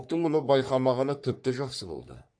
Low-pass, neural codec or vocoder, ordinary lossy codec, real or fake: 9.9 kHz; codec, 44.1 kHz, 3.4 kbps, Pupu-Codec; AAC, 64 kbps; fake